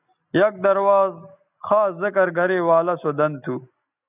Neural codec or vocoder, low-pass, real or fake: none; 3.6 kHz; real